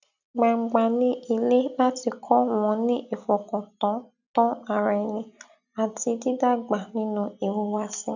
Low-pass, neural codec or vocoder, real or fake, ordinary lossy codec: 7.2 kHz; none; real; none